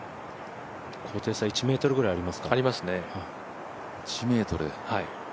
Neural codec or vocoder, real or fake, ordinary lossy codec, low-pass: none; real; none; none